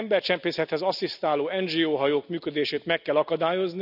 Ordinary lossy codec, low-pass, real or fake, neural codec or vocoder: none; 5.4 kHz; real; none